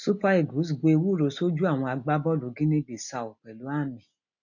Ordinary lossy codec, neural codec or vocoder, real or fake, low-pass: MP3, 48 kbps; none; real; 7.2 kHz